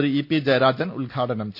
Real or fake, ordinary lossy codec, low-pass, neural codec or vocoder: real; none; 5.4 kHz; none